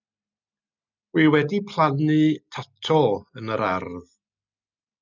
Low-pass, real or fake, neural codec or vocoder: 7.2 kHz; real; none